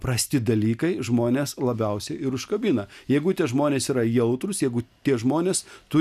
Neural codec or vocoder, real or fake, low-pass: none; real; 14.4 kHz